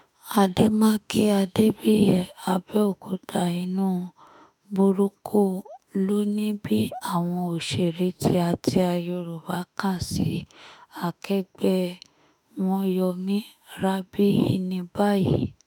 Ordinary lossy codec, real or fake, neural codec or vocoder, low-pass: none; fake; autoencoder, 48 kHz, 32 numbers a frame, DAC-VAE, trained on Japanese speech; none